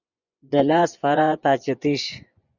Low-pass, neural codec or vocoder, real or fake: 7.2 kHz; vocoder, 24 kHz, 100 mel bands, Vocos; fake